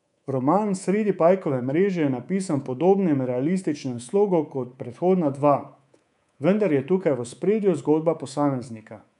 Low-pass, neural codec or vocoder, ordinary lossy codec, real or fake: 10.8 kHz; codec, 24 kHz, 3.1 kbps, DualCodec; none; fake